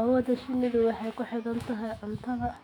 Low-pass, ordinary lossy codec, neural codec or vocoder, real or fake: 19.8 kHz; none; autoencoder, 48 kHz, 128 numbers a frame, DAC-VAE, trained on Japanese speech; fake